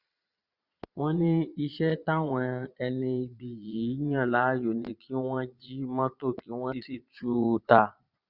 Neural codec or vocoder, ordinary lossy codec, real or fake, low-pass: vocoder, 22.05 kHz, 80 mel bands, Vocos; Opus, 64 kbps; fake; 5.4 kHz